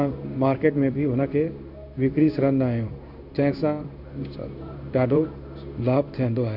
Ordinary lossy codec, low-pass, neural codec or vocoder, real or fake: none; 5.4 kHz; codec, 16 kHz in and 24 kHz out, 1 kbps, XY-Tokenizer; fake